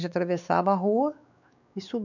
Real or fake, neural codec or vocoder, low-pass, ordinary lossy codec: fake; codec, 16 kHz, 4 kbps, X-Codec, WavLM features, trained on Multilingual LibriSpeech; 7.2 kHz; none